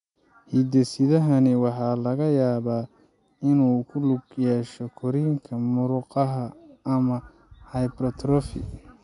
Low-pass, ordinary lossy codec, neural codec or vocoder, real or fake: 10.8 kHz; none; none; real